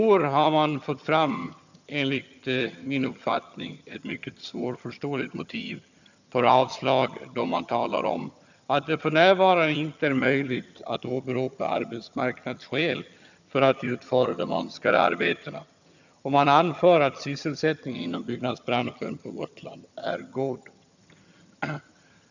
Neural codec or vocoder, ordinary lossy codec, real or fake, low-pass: vocoder, 22.05 kHz, 80 mel bands, HiFi-GAN; none; fake; 7.2 kHz